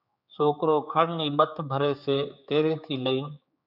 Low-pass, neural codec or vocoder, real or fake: 5.4 kHz; codec, 16 kHz, 4 kbps, X-Codec, HuBERT features, trained on general audio; fake